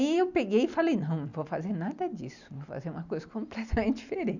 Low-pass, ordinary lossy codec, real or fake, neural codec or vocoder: 7.2 kHz; none; real; none